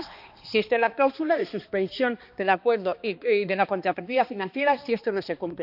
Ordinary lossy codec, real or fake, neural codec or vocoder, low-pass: none; fake; codec, 16 kHz, 2 kbps, X-Codec, HuBERT features, trained on balanced general audio; 5.4 kHz